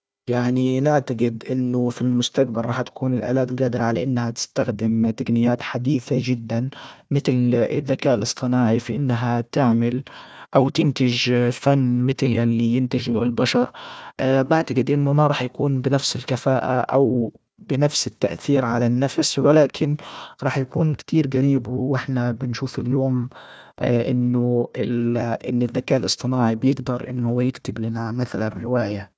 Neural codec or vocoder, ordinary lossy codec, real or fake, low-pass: codec, 16 kHz, 1 kbps, FunCodec, trained on Chinese and English, 50 frames a second; none; fake; none